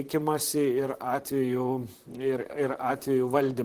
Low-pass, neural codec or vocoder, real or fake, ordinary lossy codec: 14.4 kHz; vocoder, 44.1 kHz, 128 mel bands, Pupu-Vocoder; fake; Opus, 16 kbps